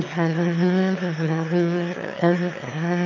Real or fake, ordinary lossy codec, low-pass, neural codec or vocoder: fake; none; 7.2 kHz; autoencoder, 22.05 kHz, a latent of 192 numbers a frame, VITS, trained on one speaker